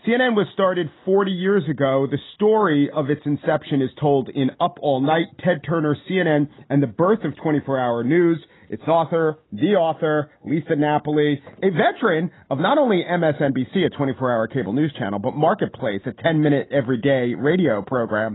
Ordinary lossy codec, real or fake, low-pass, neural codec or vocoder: AAC, 16 kbps; real; 7.2 kHz; none